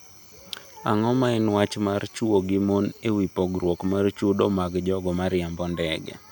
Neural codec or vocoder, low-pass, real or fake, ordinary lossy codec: none; none; real; none